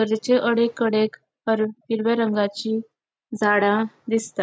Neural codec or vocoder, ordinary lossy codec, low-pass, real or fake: none; none; none; real